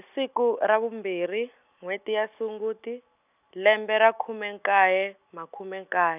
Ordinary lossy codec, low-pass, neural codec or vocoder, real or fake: none; 3.6 kHz; none; real